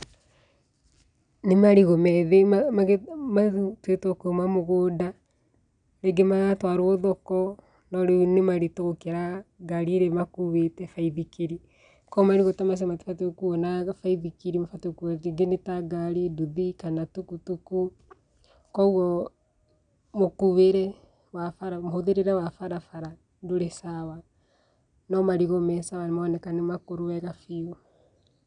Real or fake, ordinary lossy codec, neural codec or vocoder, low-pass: real; none; none; 9.9 kHz